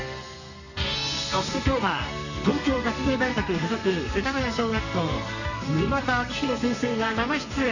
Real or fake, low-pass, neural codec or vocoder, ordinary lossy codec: fake; 7.2 kHz; codec, 32 kHz, 1.9 kbps, SNAC; none